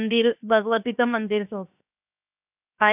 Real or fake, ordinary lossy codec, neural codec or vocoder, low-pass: fake; none; codec, 16 kHz, 0.7 kbps, FocalCodec; 3.6 kHz